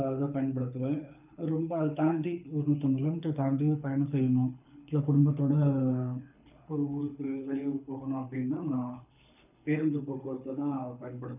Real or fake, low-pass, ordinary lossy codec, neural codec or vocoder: fake; 3.6 kHz; none; codec, 24 kHz, 6 kbps, HILCodec